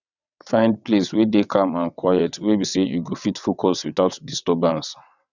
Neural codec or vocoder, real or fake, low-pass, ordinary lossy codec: vocoder, 22.05 kHz, 80 mel bands, WaveNeXt; fake; 7.2 kHz; none